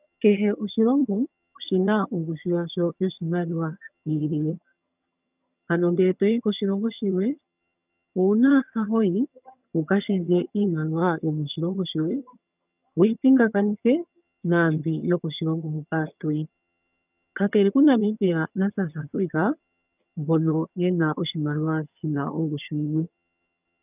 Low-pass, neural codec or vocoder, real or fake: 3.6 kHz; vocoder, 22.05 kHz, 80 mel bands, HiFi-GAN; fake